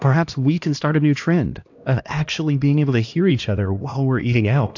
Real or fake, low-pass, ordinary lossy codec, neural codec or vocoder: fake; 7.2 kHz; AAC, 48 kbps; codec, 16 kHz, 1 kbps, X-Codec, HuBERT features, trained on LibriSpeech